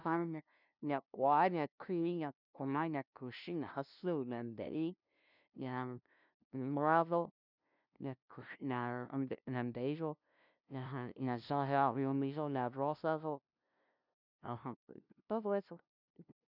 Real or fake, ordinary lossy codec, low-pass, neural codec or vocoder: fake; none; 5.4 kHz; codec, 16 kHz, 0.5 kbps, FunCodec, trained on LibriTTS, 25 frames a second